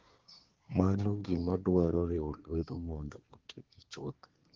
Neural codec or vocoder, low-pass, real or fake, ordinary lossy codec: codec, 24 kHz, 1 kbps, SNAC; 7.2 kHz; fake; Opus, 16 kbps